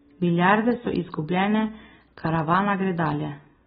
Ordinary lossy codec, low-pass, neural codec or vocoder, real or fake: AAC, 16 kbps; 19.8 kHz; none; real